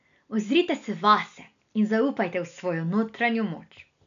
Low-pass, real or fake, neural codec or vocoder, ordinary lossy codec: 7.2 kHz; real; none; none